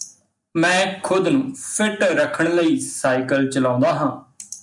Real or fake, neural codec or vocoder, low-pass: real; none; 10.8 kHz